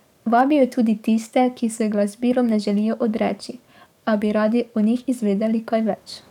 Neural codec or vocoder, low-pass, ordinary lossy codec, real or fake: codec, 44.1 kHz, 7.8 kbps, DAC; 19.8 kHz; none; fake